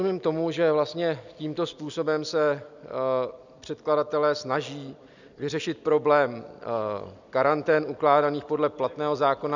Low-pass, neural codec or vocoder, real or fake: 7.2 kHz; none; real